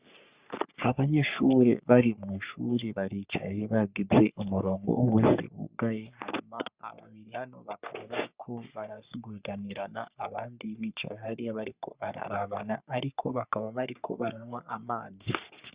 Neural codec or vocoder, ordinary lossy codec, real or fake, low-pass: codec, 44.1 kHz, 3.4 kbps, Pupu-Codec; Opus, 64 kbps; fake; 3.6 kHz